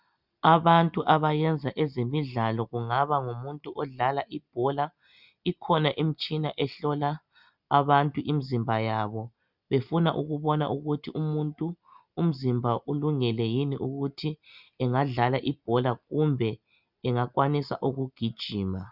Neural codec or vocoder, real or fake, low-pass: none; real; 5.4 kHz